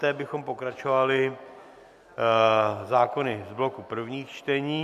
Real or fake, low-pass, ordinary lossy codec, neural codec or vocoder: real; 14.4 kHz; MP3, 96 kbps; none